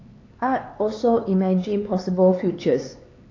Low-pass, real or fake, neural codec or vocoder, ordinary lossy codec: 7.2 kHz; fake; codec, 16 kHz, 4 kbps, X-Codec, HuBERT features, trained on LibriSpeech; AAC, 32 kbps